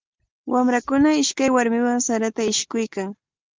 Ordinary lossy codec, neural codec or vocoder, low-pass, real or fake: Opus, 32 kbps; none; 7.2 kHz; real